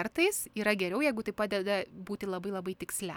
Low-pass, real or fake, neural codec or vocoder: 19.8 kHz; real; none